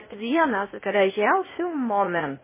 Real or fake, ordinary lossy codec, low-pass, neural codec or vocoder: fake; MP3, 16 kbps; 3.6 kHz; codec, 16 kHz, 0.8 kbps, ZipCodec